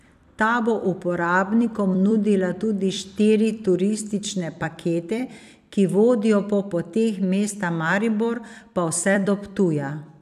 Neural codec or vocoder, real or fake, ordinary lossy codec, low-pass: vocoder, 44.1 kHz, 128 mel bands every 256 samples, BigVGAN v2; fake; none; 14.4 kHz